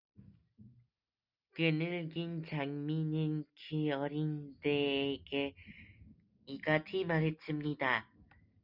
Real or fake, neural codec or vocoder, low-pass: real; none; 5.4 kHz